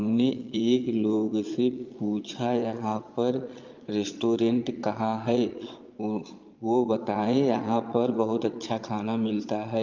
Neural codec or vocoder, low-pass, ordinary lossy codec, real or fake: vocoder, 22.05 kHz, 80 mel bands, Vocos; 7.2 kHz; Opus, 24 kbps; fake